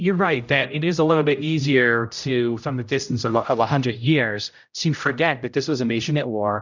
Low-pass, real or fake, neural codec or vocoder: 7.2 kHz; fake; codec, 16 kHz, 0.5 kbps, X-Codec, HuBERT features, trained on general audio